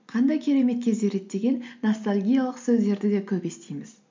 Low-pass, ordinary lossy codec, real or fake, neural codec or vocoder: 7.2 kHz; MP3, 64 kbps; real; none